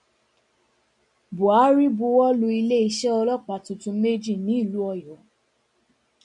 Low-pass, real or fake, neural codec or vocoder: 10.8 kHz; real; none